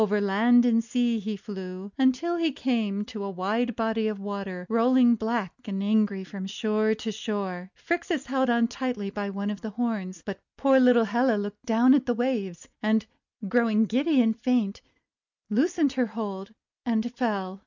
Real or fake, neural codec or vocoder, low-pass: real; none; 7.2 kHz